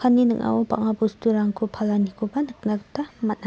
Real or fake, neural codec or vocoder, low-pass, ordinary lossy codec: real; none; none; none